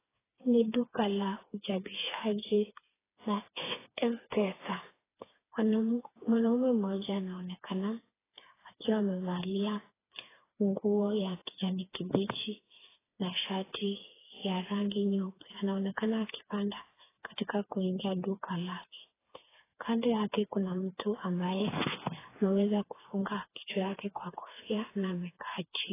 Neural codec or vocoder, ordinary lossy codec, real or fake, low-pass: codec, 16 kHz, 4 kbps, FreqCodec, smaller model; AAC, 16 kbps; fake; 3.6 kHz